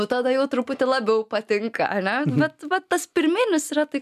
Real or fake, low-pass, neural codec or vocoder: real; 14.4 kHz; none